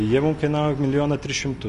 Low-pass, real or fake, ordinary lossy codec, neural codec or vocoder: 10.8 kHz; real; MP3, 48 kbps; none